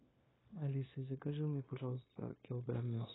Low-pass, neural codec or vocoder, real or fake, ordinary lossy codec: 7.2 kHz; codec, 16 kHz, 0.9 kbps, LongCat-Audio-Codec; fake; AAC, 16 kbps